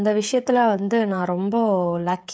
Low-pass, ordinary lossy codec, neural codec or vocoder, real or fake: none; none; codec, 16 kHz, 16 kbps, FreqCodec, smaller model; fake